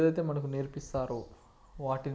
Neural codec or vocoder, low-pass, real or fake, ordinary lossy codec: none; none; real; none